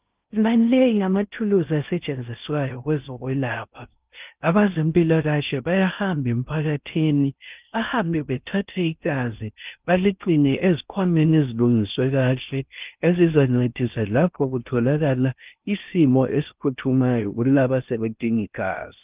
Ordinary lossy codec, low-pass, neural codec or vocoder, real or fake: Opus, 24 kbps; 3.6 kHz; codec, 16 kHz in and 24 kHz out, 0.6 kbps, FocalCodec, streaming, 2048 codes; fake